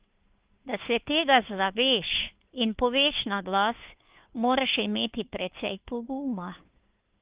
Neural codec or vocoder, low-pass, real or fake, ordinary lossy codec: codec, 16 kHz, 2 kbps, FunCodec, trained on Chinese and English, 25 frames a second; 3.6 kHz; fake; Opus, 32 kbps